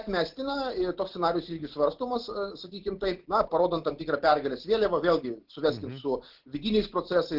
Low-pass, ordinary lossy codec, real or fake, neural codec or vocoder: 5.4 kHz; Opus, 16 kbps; real; none